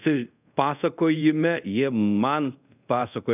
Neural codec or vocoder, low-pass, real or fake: codec, 24 kHz, 0.5 kbps, DualCodec; 3.6 kHz; fake